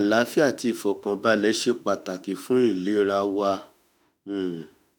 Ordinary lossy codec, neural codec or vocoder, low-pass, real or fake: none; autoencoder, 48 kHz, 32 numbers a frame, DAC-VAE, trained on Japanese speech; none; fake